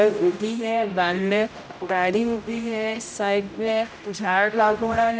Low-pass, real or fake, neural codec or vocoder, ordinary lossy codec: none; fake; codec, 16 kHz, 0.5 kbps, X-Codec, HuBERT features, trained on general audio; none